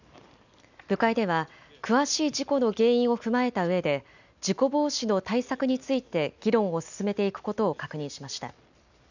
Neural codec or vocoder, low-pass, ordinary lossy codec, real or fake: none; 7.2 kHz; none; real